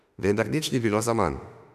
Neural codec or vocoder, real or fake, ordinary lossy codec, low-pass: autoencoder, 48 kHz, 32 numbers a frame, DAC-VAE, trained on Japanese speech; fake; none; 14.4 kHz